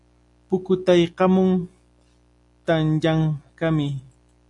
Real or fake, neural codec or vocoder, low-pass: real; none; 10.8 kHz